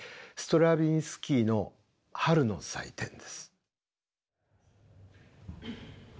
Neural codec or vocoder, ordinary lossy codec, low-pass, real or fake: none; none; none; real